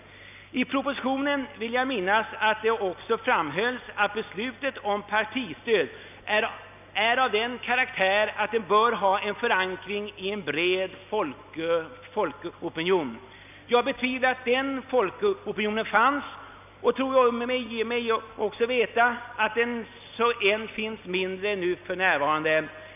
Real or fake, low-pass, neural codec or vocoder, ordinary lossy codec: real; 3.6 kHz; none; none